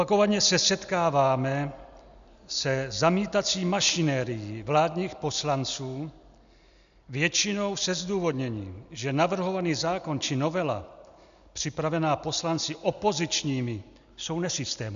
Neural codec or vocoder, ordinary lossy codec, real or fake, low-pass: none; AAC, 96 kbps; real; 7.2 kHz